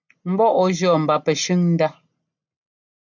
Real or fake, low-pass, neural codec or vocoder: real; 7.2 kHz; none